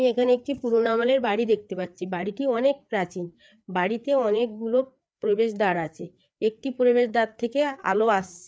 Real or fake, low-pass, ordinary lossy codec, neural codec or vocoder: fake; none; none; codec, 16 kHz, 4 kbps, FreqCodec, larger model